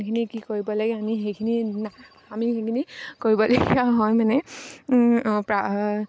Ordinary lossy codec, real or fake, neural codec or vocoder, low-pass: none; real; none; none